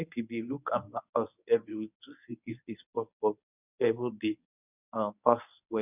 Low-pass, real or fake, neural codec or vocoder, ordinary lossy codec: 3.6 kHz; fake; codec, 24 kHz, 0.9 kbps, WavTokenizer, medium speech release version 1; none